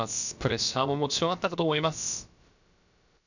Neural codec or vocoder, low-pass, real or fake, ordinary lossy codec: codec, 16 kHz, about 1 kbps, DyCAST, with the encoder's durations; 7.2 kHz; fake; none